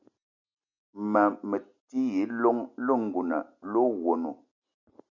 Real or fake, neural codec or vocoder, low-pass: real; none; 7.2 kHz